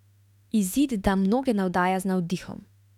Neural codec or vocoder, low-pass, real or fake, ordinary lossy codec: autoencoder, 48 kHz, 32 numbers a frame, DAC-VAE, trained on Japanese speech; 19.8 kHz; fake; none